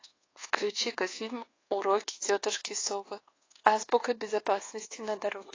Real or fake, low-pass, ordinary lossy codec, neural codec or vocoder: fake; 7.2 kHz; AAC, 32 kbps; codec, 24 kHz, 1.2 kbps, DualCodec